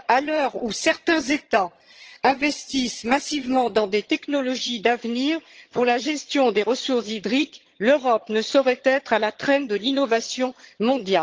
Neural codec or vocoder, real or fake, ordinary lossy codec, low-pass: vocoder, 22.05 kHz, 80 mel bands, HiFi-GAN; fake; Opus, 16 kbps; 7.2 kHz